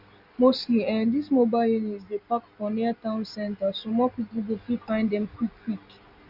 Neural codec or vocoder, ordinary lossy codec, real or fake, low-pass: none; Opus, 64 kbps; real; 5.4 kHz